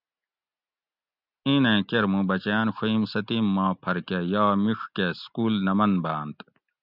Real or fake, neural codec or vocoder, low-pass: real; none; 5.4 kHz